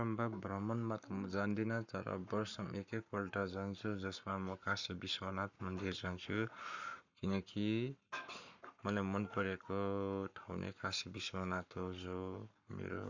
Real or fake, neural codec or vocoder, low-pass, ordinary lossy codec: fake; codec, 44.1 kHz, 7.8 kbps, Pupu-Codec; 7.2 kHz; none